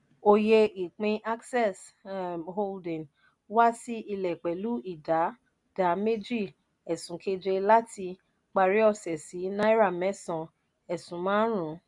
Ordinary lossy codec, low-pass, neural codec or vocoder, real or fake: MP3, 96 kbps; 10.8 kHz; none; real